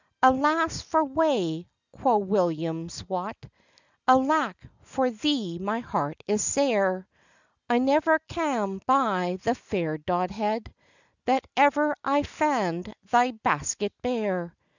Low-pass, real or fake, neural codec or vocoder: 7.2 kHz; real; none